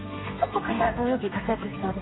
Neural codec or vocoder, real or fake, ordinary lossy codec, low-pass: codec, 32 kHz, 1.9 kbps, SNAC; fake; AAC, 16 kbps; 7.2 kHz